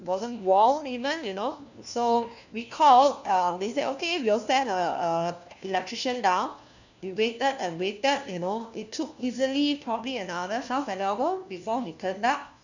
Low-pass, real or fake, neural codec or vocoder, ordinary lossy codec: 7.2 kHz; fake; codec, 16 kHz, 1 kbps, FunCodec, trained on LibriTTS, 50 frames a second; none